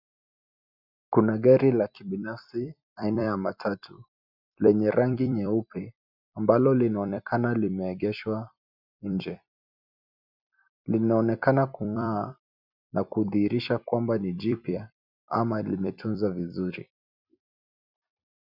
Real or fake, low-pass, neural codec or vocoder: fake; 5.4 kHz; vocoder, 44.1 kHz, 128 mel bands every 256 samples, BigVGAN v2